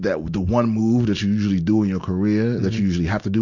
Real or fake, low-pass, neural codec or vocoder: real; 7.2 kHz; none